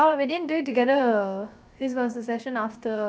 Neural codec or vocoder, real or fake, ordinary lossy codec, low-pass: codec, 16 kHz, 0.7 kbps, FocalCodec; fake; none; none